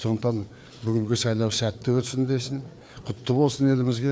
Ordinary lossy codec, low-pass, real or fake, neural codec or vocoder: none; none; fake; codec, 16 kHz, 4 kbps, FreqCodec, larger model